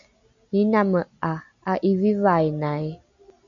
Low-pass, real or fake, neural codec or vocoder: 7.2 kHz; real; none